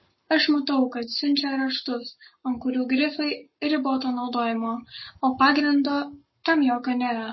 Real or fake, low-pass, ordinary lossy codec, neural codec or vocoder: fake; 7.2 kHz; MP3, 24 kbps; codec, 44.1 kHz, 7.8 kbps, Pupu-Codec